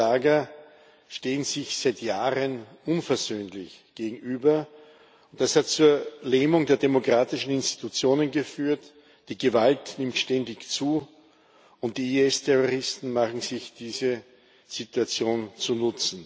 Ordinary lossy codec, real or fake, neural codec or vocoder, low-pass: none; real; none; none